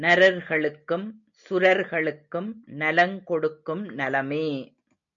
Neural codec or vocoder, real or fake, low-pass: none; real; 7.2 kHz